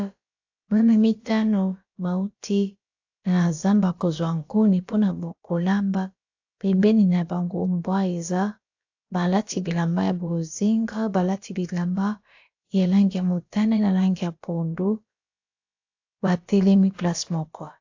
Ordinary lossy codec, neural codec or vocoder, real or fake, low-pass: AAC, 48 kbps; codec, 16 kHz, about 1 kbps, DyCAST, with the encoder's durations; fake; 7.2 kHz